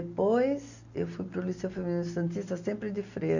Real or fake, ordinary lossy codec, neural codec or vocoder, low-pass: real; none; none; 7.2 kHz